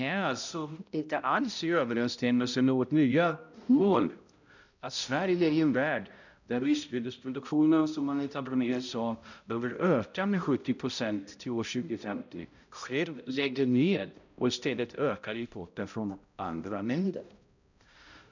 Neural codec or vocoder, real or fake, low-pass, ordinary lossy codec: codec, 16 kHz, 0.5 kbps, X-Codec, HuBERT features, trained on balanced general audio; fake; 7.2 kHz; none